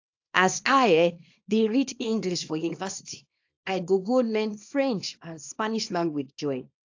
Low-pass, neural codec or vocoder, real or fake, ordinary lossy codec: 7.2 kHz; codec, 24 kHz, 0.9 kbps, WavTokenizer, small release; fake; AAC, 48 kbps